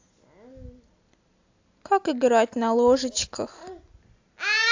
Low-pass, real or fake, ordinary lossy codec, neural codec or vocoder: 7.2 kHz; real; AAC, 48 kbps; none